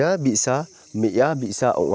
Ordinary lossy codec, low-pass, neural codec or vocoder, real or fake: none; none; none; real